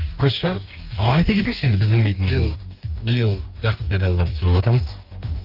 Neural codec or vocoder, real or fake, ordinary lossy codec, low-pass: codec, 44.1 kHz, 2.6 kbps, DAC; fake; Opus, 24 kbps; 5.4 kHz